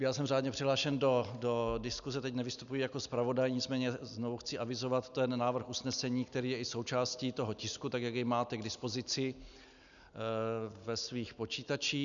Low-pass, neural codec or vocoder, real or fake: 7.2 kHz; none; real